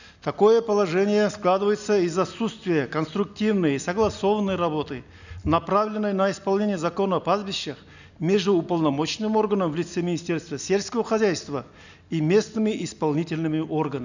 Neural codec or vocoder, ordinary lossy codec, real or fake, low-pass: none; none; real; 7.2 kHz